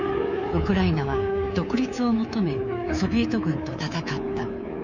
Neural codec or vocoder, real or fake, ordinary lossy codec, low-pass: codec, 24 kHz, 3.1 kbps, DualCodec; fake; none; 7.2 kHz